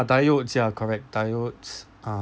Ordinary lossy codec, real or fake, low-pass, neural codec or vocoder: none; real; none; none